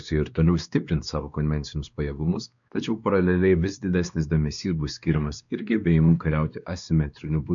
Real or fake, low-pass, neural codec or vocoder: fake; 7.2 kHz; codec, 16 kHz, 2 kbps, X-Codec, WavLM features, trained on Multilingual LibriSpeech